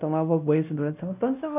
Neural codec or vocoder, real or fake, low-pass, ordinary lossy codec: codec, 16 kHz, 1 kbps, X-Codec, WavLM features, trained on Multilingual LibriSpeech; fake; 3.6 kHz; none